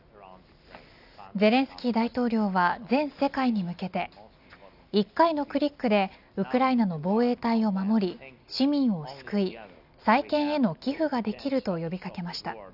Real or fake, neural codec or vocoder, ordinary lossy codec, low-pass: real; none; none; 5.4 kHz